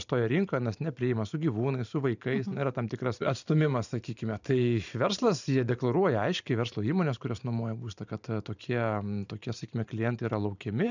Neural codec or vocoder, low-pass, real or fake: vocoder, 44.1 kHz, 128 mel bands every 512 samples, BigVGAN v2; 7.2 kHz; fake